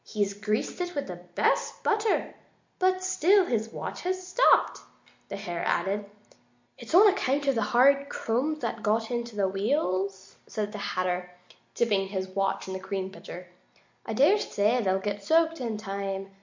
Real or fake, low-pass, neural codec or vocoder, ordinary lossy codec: real; 7.2 kHz; none; MP3, 48 kbps